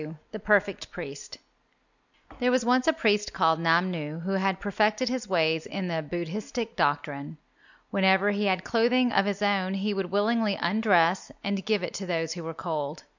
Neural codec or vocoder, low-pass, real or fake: none; 7.2 kHz; real